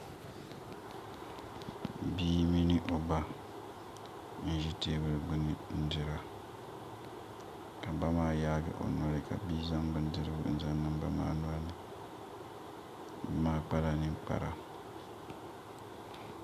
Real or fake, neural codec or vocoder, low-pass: real; none; 14.4 kHz